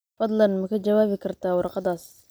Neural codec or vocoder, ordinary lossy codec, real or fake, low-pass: none; none; real; none